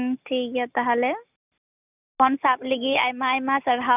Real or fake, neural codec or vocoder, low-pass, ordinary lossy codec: real; none; 3.6 kHz; none